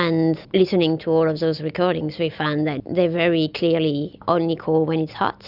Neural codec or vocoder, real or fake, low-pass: none; real; 5.4 kHz